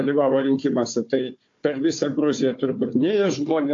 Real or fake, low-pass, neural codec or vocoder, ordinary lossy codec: fake; 7.2 kHz; codec, 16 kHz, 4 kbps, FunCodec, trained on Chinese and English, 50 frames a second; AAC, 48 kbps